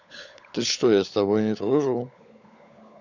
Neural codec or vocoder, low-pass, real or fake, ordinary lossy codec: codec, 16 kHz, 16 kbps, FunCodec, trained on LibriTTS, 50 frames a second; 7.2 kHz; fake; none